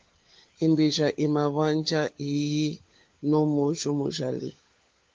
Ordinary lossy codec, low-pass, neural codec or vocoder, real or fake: Opus, 32 kbps; 7.2 kHz; codec, 16 kHz, 4 kbps, FunCodec, trained on LibriTTS, 50 frames a second; fake